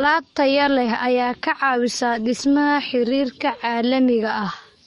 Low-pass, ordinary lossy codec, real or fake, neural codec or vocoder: 19.8 kHz; MP3, 48 kbps; fake; codec, 44.1 kHz, 7.8 kbps, DAC